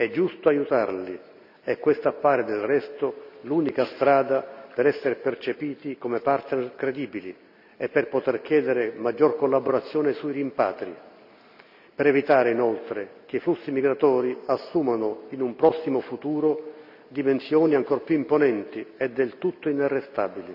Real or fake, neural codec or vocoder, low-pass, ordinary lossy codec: real; none; 5.4 kHz; none